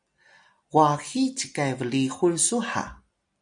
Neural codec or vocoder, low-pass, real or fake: none; 9.9 kHz; real